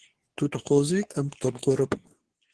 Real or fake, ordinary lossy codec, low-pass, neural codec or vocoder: fake; Opus, 16 kbps; 10.8 kHz; codec, 24 kHz, 0.9 kbps, WavTokenizer, medium speech release version 2